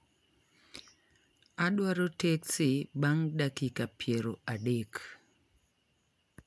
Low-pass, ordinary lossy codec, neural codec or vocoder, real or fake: none; none; none; real